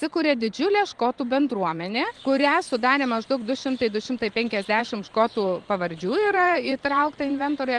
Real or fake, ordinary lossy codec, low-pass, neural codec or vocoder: fake; Opus, 24 kbps; 10.8 kHz; vocoder, 44.1 kHz, 128 mel bands every 512 samples, BigVGAN v2